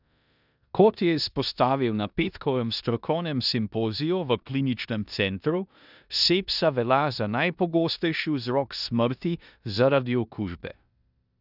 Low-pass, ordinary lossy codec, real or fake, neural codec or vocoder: 5.4 kHz; none; fake; codec, 16 kHz in and 24 kHz out, 0.9 kbps, LongCat-Audio-Codec, four codebook decoder